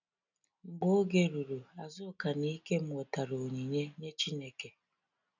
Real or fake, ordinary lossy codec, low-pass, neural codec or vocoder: real; none; 7.2 kHz; none